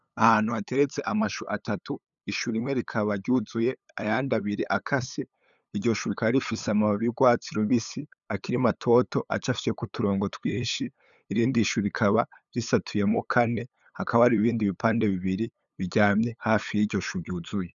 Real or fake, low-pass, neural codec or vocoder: fake; 7.2 kHz; codec, 16 kHz, 8 kbps, FunCodec, trained on LibriTTS, 25 frames a second